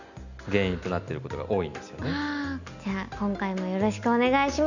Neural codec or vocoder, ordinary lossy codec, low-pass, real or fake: none; none; 7.2 kHz; real